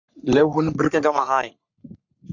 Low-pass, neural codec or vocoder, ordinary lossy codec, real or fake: 7.2 kHz; codec, 44.1 kHz, 3.4 kbps, Pupu-Codec; Opus, 64 kbps; fake